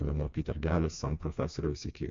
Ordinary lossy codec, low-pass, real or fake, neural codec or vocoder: MP3, 48 kbps; 7.2 kHz; fake; codec, 16 kHz, 2 kbps, FreqCodec, smaller model